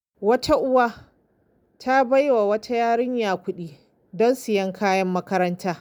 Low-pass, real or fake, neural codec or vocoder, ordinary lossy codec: none; real; none; none